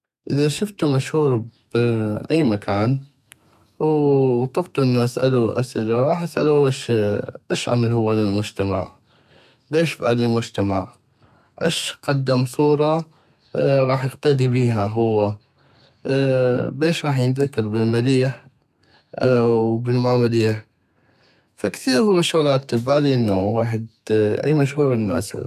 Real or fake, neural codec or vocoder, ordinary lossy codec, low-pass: fake; codec, 32 kHz, 1.9 kbps, SNAC; none; 14.4 kHz